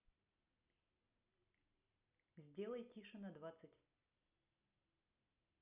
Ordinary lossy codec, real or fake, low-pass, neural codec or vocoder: none; real; 3.6 kHz; none